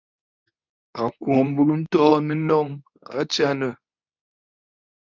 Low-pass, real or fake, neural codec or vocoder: 7.2 kHz; fake; codec, 24 kHz, 0.9 kbps, WavTokenizer, medium speech release version 2